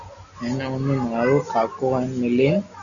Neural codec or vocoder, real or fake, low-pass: none; real; 7.2 kHz